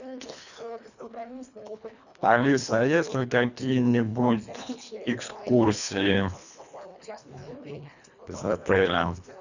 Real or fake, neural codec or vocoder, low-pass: fake; codec, 24 kHz, 1.5 kbps, HILCodec; 7.2 kHz